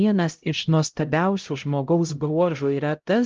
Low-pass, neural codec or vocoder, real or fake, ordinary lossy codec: 7.2 kHz; codec, 16 kHz, 0.5 kbps, X-Codec, HuBERT features, trained on LibriSpeech; fake; Opus, 32 kbps